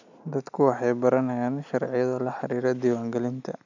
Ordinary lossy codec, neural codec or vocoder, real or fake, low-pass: none; none; real; 7.2 kHz